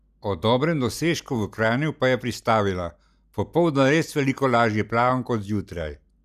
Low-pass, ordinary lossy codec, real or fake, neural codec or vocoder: 14.4 kHz; none; real; none